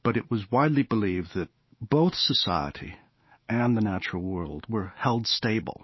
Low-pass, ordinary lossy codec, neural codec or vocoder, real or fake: 7.2 kHz; MP3, 24 kbps; autoencoder, 48 kHz, 128 numbers a frame, DAC-VAE, trained on Japanese speech; fake